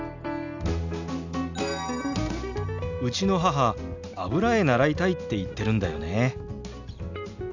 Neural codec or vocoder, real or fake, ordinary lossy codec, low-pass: none; real; none; 7.2 kHz